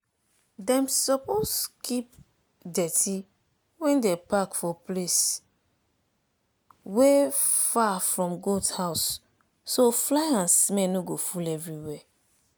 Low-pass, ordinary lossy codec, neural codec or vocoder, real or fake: none; none; none; real